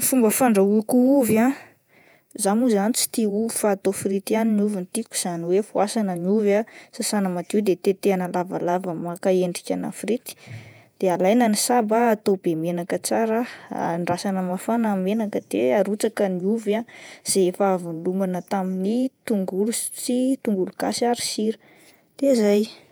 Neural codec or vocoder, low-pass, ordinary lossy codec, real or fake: vocoder, 48 kHz, 128 mel bands, Vocos; none; none; fake